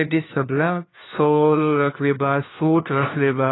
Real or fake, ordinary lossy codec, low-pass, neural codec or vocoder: fake; AAC, 16 kbps; 7.2 kHz; codec, 16 kHz, 1 kbps, FunCodec, trained on LibriTTS, 50 frames a second